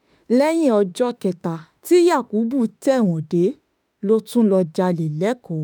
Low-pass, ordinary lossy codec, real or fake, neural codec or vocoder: none; none; fake; autoencoder, 48 kHz, 32 numbers a frame, DAC-VAE, trained on Japanese speech